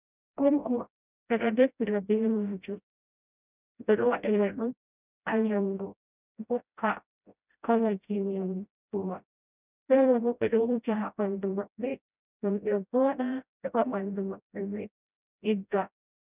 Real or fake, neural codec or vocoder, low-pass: fake; codec, 16 kHz, 0.5 kbps, FreqCodec, smaller model; 3.6 kHz